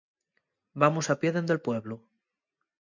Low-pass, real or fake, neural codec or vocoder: 7.2 kHz; real; none